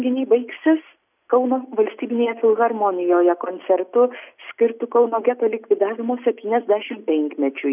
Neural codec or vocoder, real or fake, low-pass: none; real; 3.6 kHz